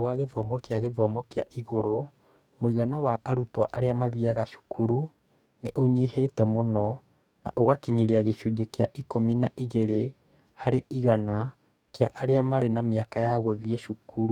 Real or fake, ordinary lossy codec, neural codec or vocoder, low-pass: fake; none; codec, 44.1 kHz, 2.6 kbps, DAC; 19.8 kHz